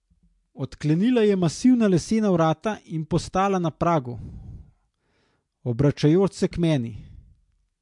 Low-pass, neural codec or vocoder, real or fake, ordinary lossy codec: 10.8 kHz; none; real; MP3, 64 kbps